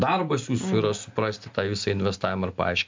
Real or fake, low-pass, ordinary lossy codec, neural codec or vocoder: real; 7.2 kHz; MP3, 64 kbps; none